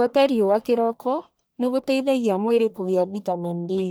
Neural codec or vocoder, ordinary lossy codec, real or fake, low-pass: codec, 44.1 kHz, 1.7 kbps, Pupu-Codec; none; fake; none